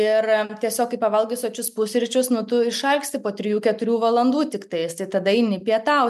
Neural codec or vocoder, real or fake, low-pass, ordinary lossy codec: none; real; 14.4 kHz; MP3, 96 kbps